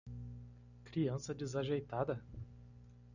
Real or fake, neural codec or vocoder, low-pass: real; none; 7.2 kHz